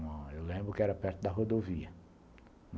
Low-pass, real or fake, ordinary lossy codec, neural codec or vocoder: none; real; none; none